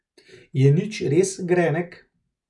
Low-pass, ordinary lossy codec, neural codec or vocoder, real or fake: 10.8 kHz; none; none; real